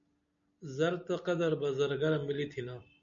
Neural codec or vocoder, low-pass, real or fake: none; 7.2 kHz; real